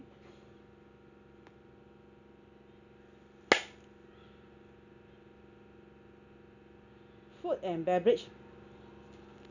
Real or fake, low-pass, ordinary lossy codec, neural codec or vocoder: real; 7.2 kHz; none; none